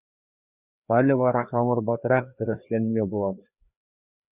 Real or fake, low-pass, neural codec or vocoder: fake; 3.6 kHz; codec, 16 kHz, 2 kbps, FreqCodec, larger model